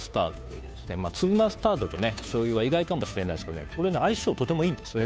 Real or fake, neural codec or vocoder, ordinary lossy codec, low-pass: fake; codec, 16 kHz, 2 kbps, FunCodec, trained on Chinese and English, 25 frames a second; none; none